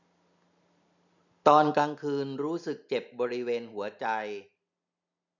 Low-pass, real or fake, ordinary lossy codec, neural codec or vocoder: 7.2 kHz; real; none; none